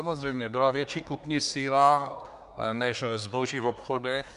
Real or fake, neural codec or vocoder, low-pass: fake; codec, 24 kHz, 1 kbps, SNAC; 10.8 kHz